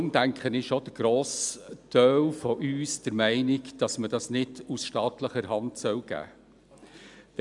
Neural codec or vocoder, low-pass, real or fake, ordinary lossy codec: none; 10.8 kHz; real; none